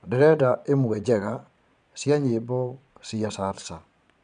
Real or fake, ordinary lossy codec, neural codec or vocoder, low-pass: fake; none; vocoder, 22.05 kHz, 80 mel bands, Vocos; 9.9 kHz